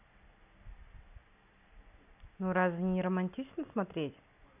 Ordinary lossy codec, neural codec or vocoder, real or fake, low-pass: none; none; real; 3.6 kHz